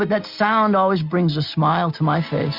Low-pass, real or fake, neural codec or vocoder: 5.4 kHz; real; none